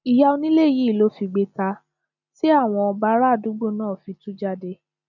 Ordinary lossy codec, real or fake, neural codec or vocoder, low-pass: none; real; none; 7.2 kHz